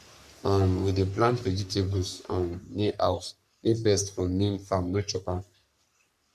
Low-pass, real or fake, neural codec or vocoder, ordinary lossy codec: 14.4 kHz; fake; codec, 44.1 kHz, 3.4 kbps, Pupu-Codec; none